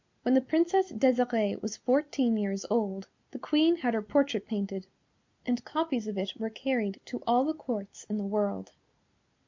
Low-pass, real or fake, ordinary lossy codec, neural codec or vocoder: 7.2 kHz; real; MP3, 64 kbps; none